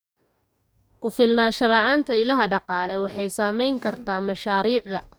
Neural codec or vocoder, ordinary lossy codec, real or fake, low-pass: codec, 44.1 kHz, 2.6 kbps, DAC; none; fake; none